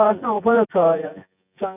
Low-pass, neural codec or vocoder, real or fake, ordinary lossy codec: 3.6 kHz; vocoder, 24 kHz, 100 mel bands, Vocos; fake; none